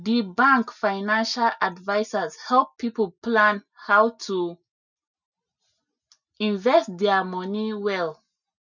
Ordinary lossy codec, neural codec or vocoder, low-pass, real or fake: none; none; 7.2 kHz; real